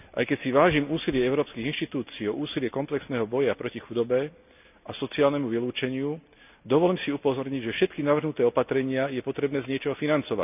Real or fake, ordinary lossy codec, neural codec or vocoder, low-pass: real; none; none; 3.6 kHz